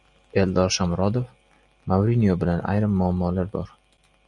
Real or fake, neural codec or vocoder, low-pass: real; none; 10.8 kHz